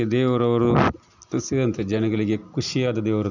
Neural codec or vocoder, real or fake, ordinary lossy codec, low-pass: none; real; none; 7.2 kHz